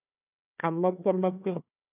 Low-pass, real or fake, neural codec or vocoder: 3.6 kHz; fake; codec, 16 kHz, 1 kbps, FunCodec, trained on Chinese and English, 50 frames a second